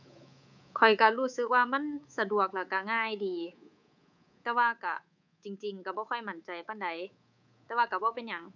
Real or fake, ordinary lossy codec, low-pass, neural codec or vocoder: fake; none; 7.2 kHz; codec, 24 kHz, 3.1 kbps, DualCodec